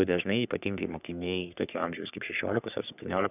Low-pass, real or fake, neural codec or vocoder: 3.6 kHz; fake; codec, 44.1 kHz, 3.4 kbps, Pupu-Codec